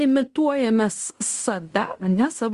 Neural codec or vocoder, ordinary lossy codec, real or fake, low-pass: codec, 16 kHz in and 24 kHz out, 0.9 kbps, LongCat-Audio-Codec, fine tuned four codebook decoder; Opus, 32 kbps; fake; 10.8 kHz